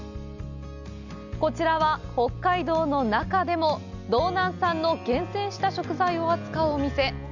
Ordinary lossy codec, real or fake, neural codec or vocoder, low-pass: none; real; none; 7.2 kHz